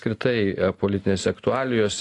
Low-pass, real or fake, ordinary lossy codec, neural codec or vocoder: 10.8 kHz; real; AAC, 48 kbps; none